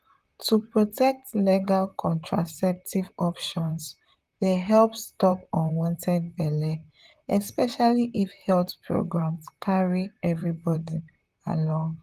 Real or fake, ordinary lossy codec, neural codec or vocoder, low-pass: fake; Opus, 24 kbps; vocoder, 44.1 kHz, 128 mel bands, Pupu-Vocoder; 14.4 kHz